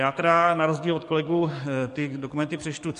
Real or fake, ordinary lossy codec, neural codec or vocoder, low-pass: fake; MP3, 48 kbps; codec, 44.1 kHz, 7.8 kbps, DAC; 14.4 kHz